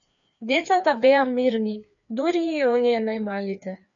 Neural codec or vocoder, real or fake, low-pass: codec, 16 kHz, 2 kbps, FreqCodec, larger model; fake; 7.2 kHz